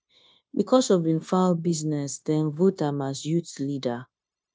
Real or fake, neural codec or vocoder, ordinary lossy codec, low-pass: fake; codec, 16 kHz, 0.9 kbps, LongCat-Audio-Codec; none; none